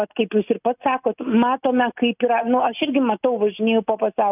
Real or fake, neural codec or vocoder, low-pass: real; none; 3.6 kHz